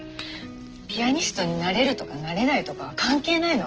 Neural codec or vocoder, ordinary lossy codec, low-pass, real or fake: none; Opus, 16 kbps; 7.2 kHz; real